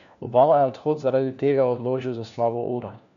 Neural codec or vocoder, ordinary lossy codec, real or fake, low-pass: codec, 16 kHz, 1 kbps, FunCodec, trained on LibriTTS, 50 frames a second; none; fake; 7.2 kHz